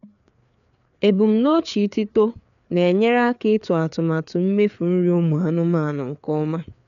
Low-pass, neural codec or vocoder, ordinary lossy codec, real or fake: 7.2 kHz; codec, 16 kHz, 4 kbps, FreqCodec, larger model; none; fake